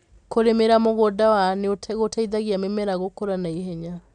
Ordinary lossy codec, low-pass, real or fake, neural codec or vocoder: none; 9.9 kHz; real; none